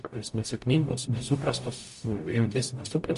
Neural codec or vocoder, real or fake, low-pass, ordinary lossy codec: codec, 44.1 kHz, 0.9 kbps, DAC; fake; 14.4 kHz; MP3, 48 kbps